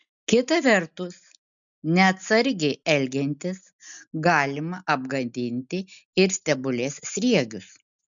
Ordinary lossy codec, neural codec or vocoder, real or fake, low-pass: MP3, 96 kbps; none; real; 7.2 kHz